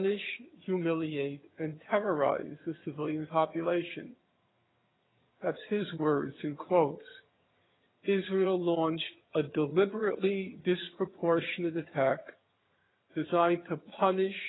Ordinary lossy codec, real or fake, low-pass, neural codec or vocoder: AAC, 16 kbps; fake; 7.2 kHz; vocoder, 22.05 kHz, 80 mel bands, HiFi-GAN